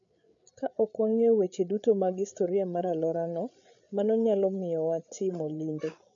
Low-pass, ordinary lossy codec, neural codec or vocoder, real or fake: 7.2 kHz; none; codec, 16 kHz, 8 kbps, FreqCodec, larger model; fake